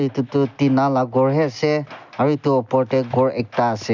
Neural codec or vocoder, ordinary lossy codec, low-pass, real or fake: none; none; 7.2 kHz; real